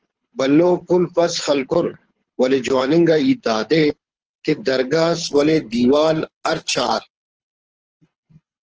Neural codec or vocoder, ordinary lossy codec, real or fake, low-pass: codec, 24 kHz, 6 kbps, HILCodec; Opus, 16 kbps; fake; 7.2 kHz